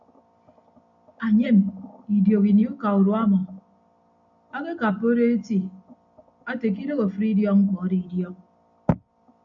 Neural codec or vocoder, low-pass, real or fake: none; 7.2 kHz; real